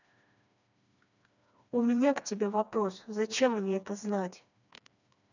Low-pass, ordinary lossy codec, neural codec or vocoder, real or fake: 7.2 kHz; none; codec, 16 kHz, 2 kbps, FreqCodec, smaller model; fake